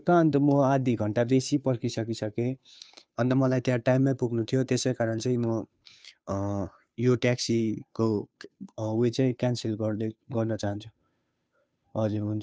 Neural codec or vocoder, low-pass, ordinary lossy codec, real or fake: codec, 16 kHz, 2 kbps, FunCodec, trained on Chinese and English, 25 frames a second; none; none; fake